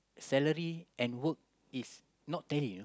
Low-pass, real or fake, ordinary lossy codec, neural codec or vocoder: none; real; none; none